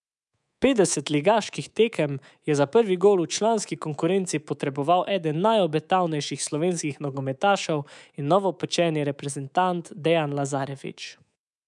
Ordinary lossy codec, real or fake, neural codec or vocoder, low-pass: none; fake; codec, 24 kHz, 3.1 kbps, DualCodec; 10.8 kHz